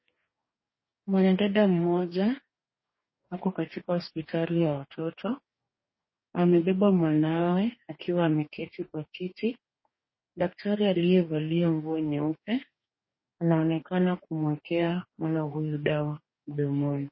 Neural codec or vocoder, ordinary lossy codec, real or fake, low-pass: codec, 44.1 kHz, 2.6 kbps, DAC; MP3, 24 kbps; fake; 7.2 kHz